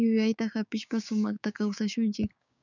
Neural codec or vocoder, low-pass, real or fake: codec, 16 kHz, 6 kbps, DAC; 7.2 kHz; fake